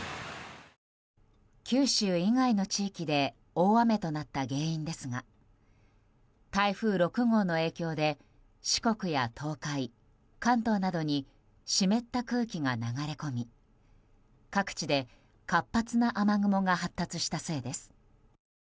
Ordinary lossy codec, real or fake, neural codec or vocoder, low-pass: none; real; none; none